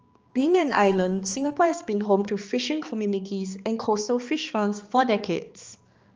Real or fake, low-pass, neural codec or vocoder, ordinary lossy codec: fake; 7.2 kHz; codec, 16 kHz, 2 kbps, X-Codec, HuBERT features, trained on balanced general audio; Opus, 24 kbps